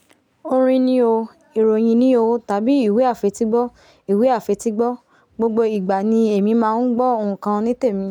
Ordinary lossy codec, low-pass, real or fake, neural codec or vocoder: none; 19.8 kHz; real; none